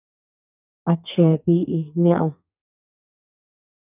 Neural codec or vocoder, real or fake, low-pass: codec, 32 kHz, 1.9 kbps, SNAC; fake; 3.6 kHz